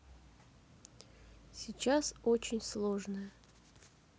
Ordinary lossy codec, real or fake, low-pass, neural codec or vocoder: none; real; none; none